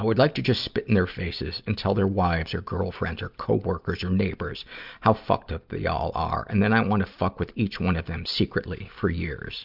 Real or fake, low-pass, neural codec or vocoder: real; 5.4 kHz; none